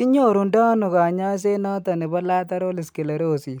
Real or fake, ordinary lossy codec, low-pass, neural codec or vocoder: real; none; none; none